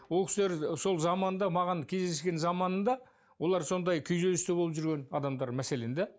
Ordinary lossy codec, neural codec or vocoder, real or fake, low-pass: none; none; real; none